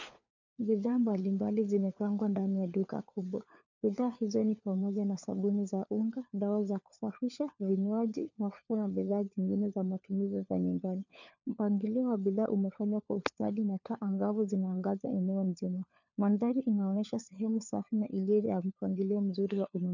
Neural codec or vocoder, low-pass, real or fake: codec, 16 kHz, 4 kbps, FunCodec, trained on LibriTTS, 50 frames a second; 7.2 kHz; fake